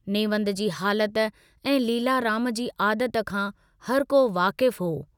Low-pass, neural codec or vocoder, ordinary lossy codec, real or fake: 19.8 kHz; none; none; real